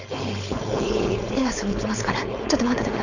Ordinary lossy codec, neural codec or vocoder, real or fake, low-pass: none; codec, 16 kHz, 4.8 kbps, FACodec; fake; 7.2 kHz